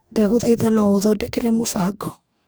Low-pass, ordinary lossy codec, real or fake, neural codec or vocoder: none; none; fake; codec, 44.1 kHz, 2.6 kbps, DAC